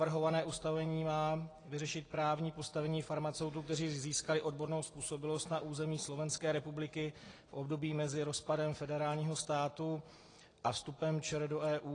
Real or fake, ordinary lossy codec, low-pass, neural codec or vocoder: real; AAC, 32 kbps; 9.9 kHz; none